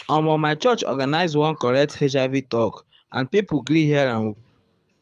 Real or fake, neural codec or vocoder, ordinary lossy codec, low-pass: fake; codec, 24 kHz, 6 kbps, HILCodec; none; none